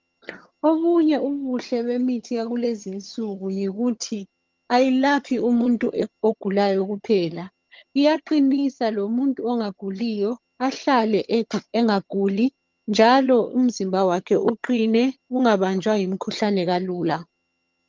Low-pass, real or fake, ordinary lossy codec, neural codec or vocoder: 7.2 kHz; fake; Opus, 32 kbps; vocoder, 22.05 kHz, 80 mel bands, HiFi-GAN